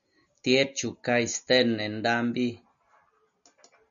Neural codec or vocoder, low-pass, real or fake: none; 7.2 kHz; real